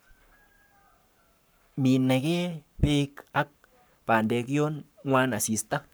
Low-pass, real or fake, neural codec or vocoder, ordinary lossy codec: none; fake; codec, 44.1 kHz, 7.8 kbps, Pupu-Codec; none